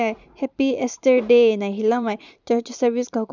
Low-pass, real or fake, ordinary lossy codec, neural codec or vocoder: 7.2 kHz; fake; none; vocoder, 44.1 kHz, 128 mel bands every 512 samples, BigVGAN v2